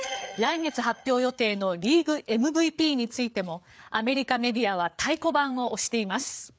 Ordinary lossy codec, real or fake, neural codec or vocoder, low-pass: none; fake; codec, 16 kHz, 4 kbps, FreqCodec, larger model; none